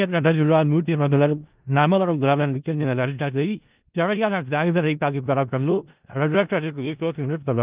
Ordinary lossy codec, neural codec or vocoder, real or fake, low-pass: Opus, 32 kbps; codec, 16 kHz in and 24 kHz out, 0.4 kbps, LongCat-Audio-Codec, four codebook decoder; fake; 3.6 kHz